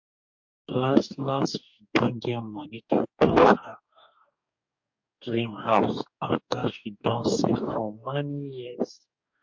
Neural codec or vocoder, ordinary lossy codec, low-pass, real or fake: codec, 44.1 kHz, 2.6 kbps, DAC; MP3, 48 kbps; 7.2 kHz; fake